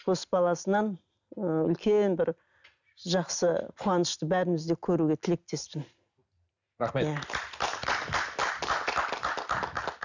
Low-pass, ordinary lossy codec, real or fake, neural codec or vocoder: 7.2 kHz; none; real; none